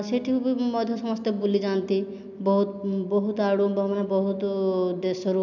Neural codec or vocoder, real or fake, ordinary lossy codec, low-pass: none; real; none; 7.2 kHz